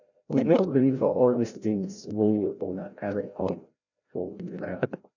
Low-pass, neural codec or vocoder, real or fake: 7.2 kHz; codec, 16 kHz, 0.5 kbps, FreqCodec, larger model; fake